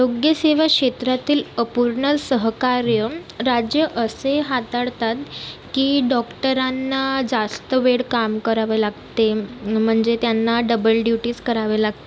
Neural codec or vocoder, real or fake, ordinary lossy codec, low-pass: none; real; none; none